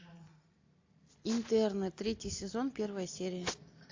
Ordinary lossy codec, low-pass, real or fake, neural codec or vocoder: AAC, 48 kbps; 7.2 kHz; real; none